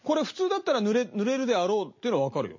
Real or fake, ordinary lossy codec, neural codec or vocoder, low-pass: real; MP3, 32 kbps; none; 7.2 kHz